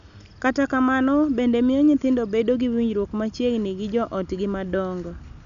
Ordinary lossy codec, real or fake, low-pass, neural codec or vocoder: none; real; 7.2 kHz; none